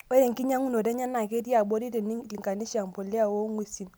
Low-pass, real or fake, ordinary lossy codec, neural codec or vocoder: none; real; none; none